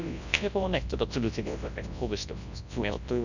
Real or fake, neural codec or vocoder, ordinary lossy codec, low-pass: fake; codec, 24 kHz, 0.9 kbps, WavTokenizer, large speech release; none; 7.2 kHz